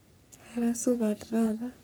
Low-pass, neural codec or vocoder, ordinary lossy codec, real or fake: none; codec, 44.1 kHz, 3.4 kbps, Pupu-Codec; none; fake